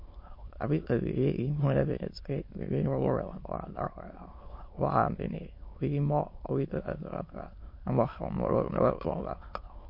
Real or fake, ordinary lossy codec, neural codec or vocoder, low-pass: fake; MP3, 32 kbps; autoencoder, 22.05 kHz, a latent of 192 numbers a frame, VITS, trained on many speakers; 5.4 kHz